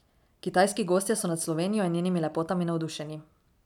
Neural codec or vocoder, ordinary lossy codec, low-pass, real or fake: none; none; 19.8 kHz; real